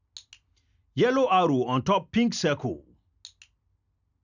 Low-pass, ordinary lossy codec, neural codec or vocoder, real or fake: 7.2 kHz; none; none; real